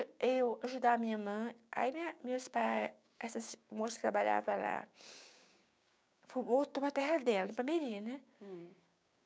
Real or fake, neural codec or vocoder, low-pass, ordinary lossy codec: fake; codec, 16 kHz, 6 kbps, DAC; none; none